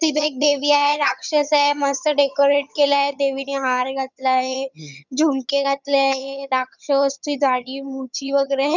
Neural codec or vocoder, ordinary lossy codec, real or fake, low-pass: vocoder, 22.05 kHz, 80 mel bands, HiFi-GAN; none; fake; 7.2 kHz